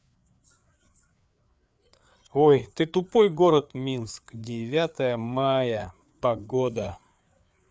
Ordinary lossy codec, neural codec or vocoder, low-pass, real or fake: none; codec, 16 kHz, 4 kbps, FreqCodec, larger model; none; fake